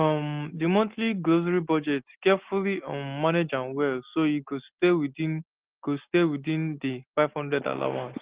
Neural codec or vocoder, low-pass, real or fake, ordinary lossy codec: none; 3.6 kHz; real; Opus, 16 kbps